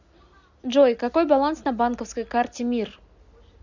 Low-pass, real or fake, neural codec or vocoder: 7.2 kHz; real; none